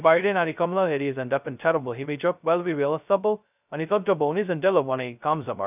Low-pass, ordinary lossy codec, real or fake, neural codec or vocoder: 3.6 kHz; none; fake; codec, 16 kHz, 0.2 kbps, FocalCodec